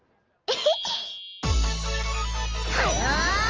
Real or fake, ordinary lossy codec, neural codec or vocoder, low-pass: real; Opus, 24 kbps; none; 7.2 kHz